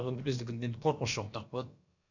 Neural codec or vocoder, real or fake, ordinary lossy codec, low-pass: codec, 16 kHz, about 1 kbps, DyCAST, with the encoder's durations; fake; none; 7.2 kHz